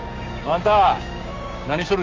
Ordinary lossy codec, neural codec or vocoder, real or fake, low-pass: Opus, 32 kbps; codec, 16 kHz, 6 kbps, DAC; fake; 7.2 kHz